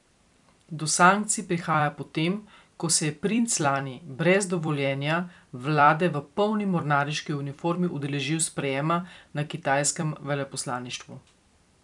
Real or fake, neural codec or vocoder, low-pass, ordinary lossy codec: fake; vocoder, 44.1 kHz, 128 mel bands every 256 samples, BigVGAN v2; 10.8 kHz; none